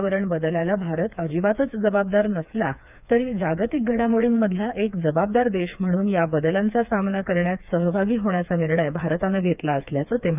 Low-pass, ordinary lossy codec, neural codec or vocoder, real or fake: 3.6 kHz; none; codec, 16 kHz, 4 kbps, FreqCodec, smaller model; fake